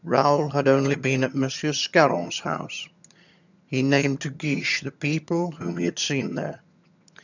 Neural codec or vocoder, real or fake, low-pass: vocoder, 22.05 kHz, 80 mel bands, HiFi-GAN; fake; 7.2 kHz